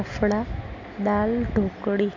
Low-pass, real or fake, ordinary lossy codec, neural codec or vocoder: 7.2 kHz; real; MP3, 64 kbps; none